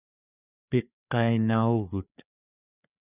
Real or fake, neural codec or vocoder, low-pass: fake; codec, 16 kHz, 4 kbps, FreqCodec, larger model; 3.6 kHz